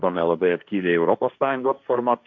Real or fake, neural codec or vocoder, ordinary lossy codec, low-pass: fake; codec, 16 kHz, 1.1 kbps, Voila-Tokenizer; MP3, 64 kbps; 7.2 kHz